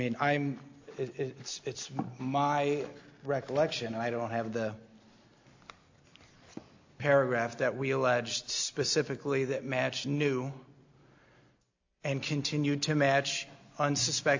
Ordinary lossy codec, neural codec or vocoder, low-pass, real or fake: AAC, 48 kbps; none; 7.2 kHz; real